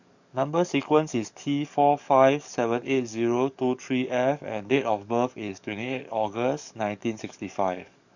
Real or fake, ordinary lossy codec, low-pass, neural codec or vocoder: fake; none; 7.2 kHz; codec, 44.1 kHz, 7.8 kbps, DAC